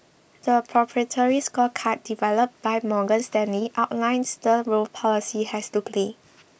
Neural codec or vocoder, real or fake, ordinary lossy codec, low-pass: none; real; none; none